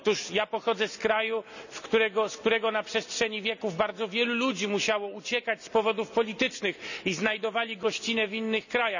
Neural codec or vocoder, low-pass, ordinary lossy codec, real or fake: none; 7.2 kHz; none; real